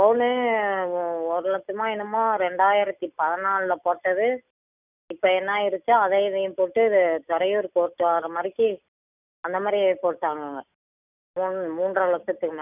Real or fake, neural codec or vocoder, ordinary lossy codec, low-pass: real; none; none; 3.6 kHz